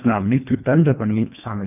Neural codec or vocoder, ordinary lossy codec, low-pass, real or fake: codec, 24 kHz, 1.5 kbps, HILCodec; none; 3.6 kHz; fake